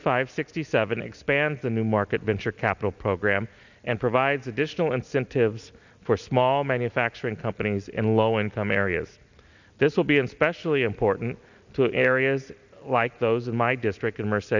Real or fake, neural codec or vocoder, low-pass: real; none; 7.2 kHz